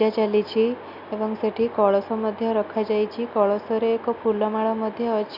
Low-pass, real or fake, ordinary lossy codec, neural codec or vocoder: 5.4 kHz; real; none; none